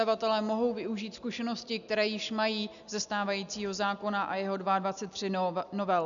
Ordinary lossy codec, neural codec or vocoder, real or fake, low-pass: MP3, 96 kbps; none; real; 7.2 kHz